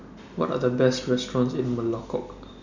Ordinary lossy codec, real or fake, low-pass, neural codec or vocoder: none; real; 7.2 kHz; none